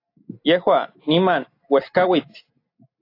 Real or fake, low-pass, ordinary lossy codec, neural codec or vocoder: real; 5.4 kHz; AAC, 32 kbps; none